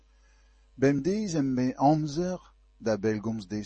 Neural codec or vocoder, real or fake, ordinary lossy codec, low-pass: none; real; MP3, 32 kbps; 10.8 kHz